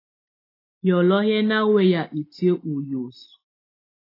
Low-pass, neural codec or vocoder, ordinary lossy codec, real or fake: 5.4 kHz; none; AAC, 24 kbps; real